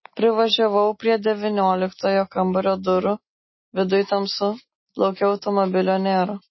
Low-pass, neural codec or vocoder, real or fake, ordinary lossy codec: 7.2 kHz; none; real; MP3, 24 kbps